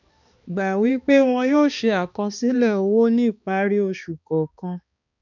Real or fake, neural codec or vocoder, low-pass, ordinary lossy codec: fake; codec, 16 kHz, 2 kbps, X-Codec, HuBERT features, trained on balanced general audio; 7.2 kHz; none